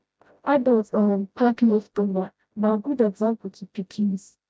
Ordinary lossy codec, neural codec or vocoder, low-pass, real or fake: none; codec, 16 kHz, 0.5 kbps, FreqCodec, smaller model; none; fake